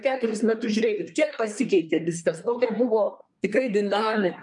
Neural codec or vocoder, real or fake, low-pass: codec, 24 kHz, 1 kbps, SNAC; fake; 10.8 kHz